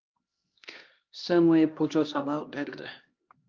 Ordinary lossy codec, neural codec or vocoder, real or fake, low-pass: Opus, 32 kbps; codec, 16 kHz, 1 kbps, X-Codec, HuBERT features, trained on LibriSpeech; fake; 7.2 kHz